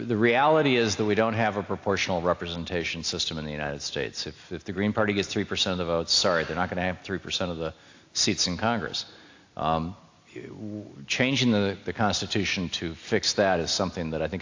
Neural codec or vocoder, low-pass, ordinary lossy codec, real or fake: none; 7.2 kHz; AAC, 48 kbps; real